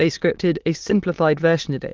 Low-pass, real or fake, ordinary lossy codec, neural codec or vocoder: 7.2 kHz; fake; Opus, 32 kbps; autoencoder, 22.05 kHz, a latent of 192 numbers a frame, VITS, trained on many speakers